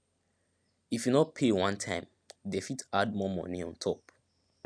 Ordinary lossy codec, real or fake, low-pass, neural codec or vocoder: none; real; none; none